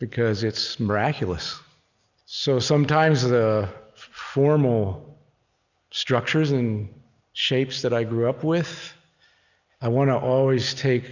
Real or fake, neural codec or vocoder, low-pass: real; none; 7.2 kHz